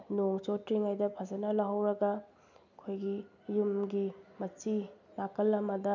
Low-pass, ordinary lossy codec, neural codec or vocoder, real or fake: 7.2 kHz; none; none; real